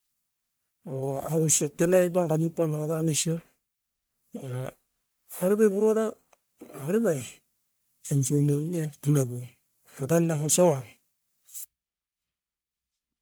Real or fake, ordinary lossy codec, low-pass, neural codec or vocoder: fake; none; none; codec, 44.1 kHz, 1.7 kbps, Pupu-Codec